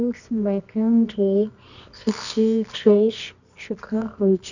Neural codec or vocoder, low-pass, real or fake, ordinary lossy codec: codec, 24 kHz, 0.9 kbps, WavTokenizer, medium music audio release; 7.2 kHz; fake; none